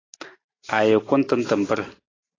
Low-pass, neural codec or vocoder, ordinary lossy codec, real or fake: 7.2 kHz; none; AAC, 32 kbps; real